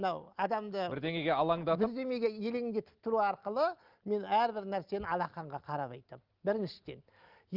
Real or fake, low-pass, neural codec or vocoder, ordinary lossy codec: real; 5.4 kHz; none; Opus, 32 kbps